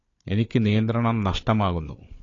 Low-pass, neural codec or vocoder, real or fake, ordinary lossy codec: 7.2 kHz; codec, 16 kHz, 4 kbps, FunCodec, trained on Chinese and English, 50 frames a second; fake; AAC, 32 kbps